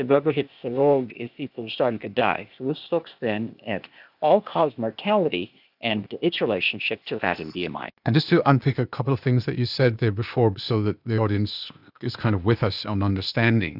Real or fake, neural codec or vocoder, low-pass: fake; codec, 16 kHz, 0.8 kbps, ZipCodec; 5.4 kHz